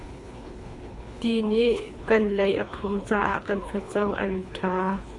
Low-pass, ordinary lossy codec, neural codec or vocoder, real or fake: 10.8 kHz; AAC, 64 kbps; codec, 24 kHz, 3 kbps, HILCodec; fake